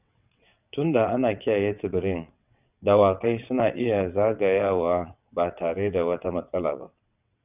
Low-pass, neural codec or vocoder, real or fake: 3.6 kHz; vocoder, 22.05 kHz, 80 mel bands, WaveNeXt; fake